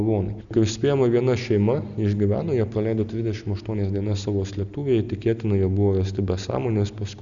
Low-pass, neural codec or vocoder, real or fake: 7.2 kHz; none; real